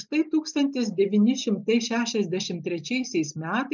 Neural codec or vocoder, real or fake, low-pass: none; real; 7.2 kHz